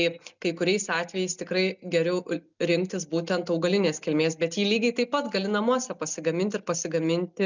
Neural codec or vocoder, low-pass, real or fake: none; 7.2 kHz; real